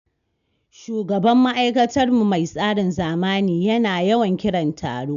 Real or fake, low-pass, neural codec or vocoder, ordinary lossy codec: real; 7.2 kHz; none; none